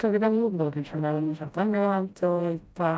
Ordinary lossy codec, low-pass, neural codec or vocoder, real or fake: none; none; codec, 16 kHz, 0.5 kbps, FreqCodec, smaller model; fake